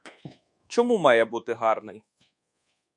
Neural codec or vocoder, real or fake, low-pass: codec, 24 kHz, 1.2 kbps, DualCodec; fake; 10.8 kHz